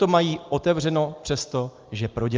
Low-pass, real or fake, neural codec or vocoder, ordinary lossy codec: 7.2 kHz; real; none; Opus, 32 kbps